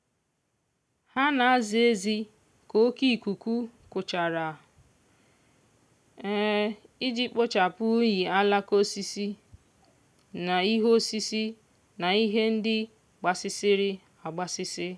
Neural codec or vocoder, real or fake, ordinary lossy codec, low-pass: none; real; none; none